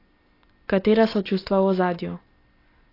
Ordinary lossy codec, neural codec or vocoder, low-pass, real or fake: AAC, 24 kbps; none; 5.4 kHz; real